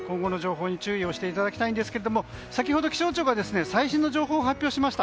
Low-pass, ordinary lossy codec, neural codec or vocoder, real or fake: none; none; none; real